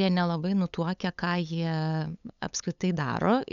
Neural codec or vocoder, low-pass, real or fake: codec, 16 kHz, 8 kbps, FunCodec, trained on LibriTTS, 25 frames a second; 7.2 kHz; fake